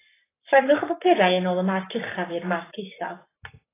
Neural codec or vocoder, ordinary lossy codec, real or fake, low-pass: codec, 44.1 kHz, 7.8 kbps, Pupu-Codec; AAC, 16 kbps; fake; 3.6 kHz